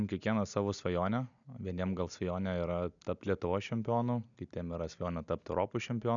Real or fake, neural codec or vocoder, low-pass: real; none; 7.2 kHz